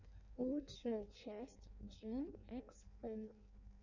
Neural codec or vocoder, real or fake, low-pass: codec, 16 kHz in and 24 kHz out, 0.6 kbps, FireRedTTS-2 codec; fake; 7.2 kHz